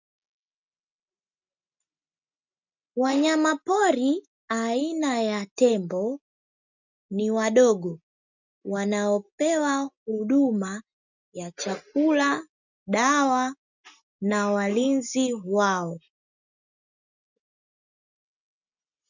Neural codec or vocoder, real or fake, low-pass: none; real; 7.2 kHz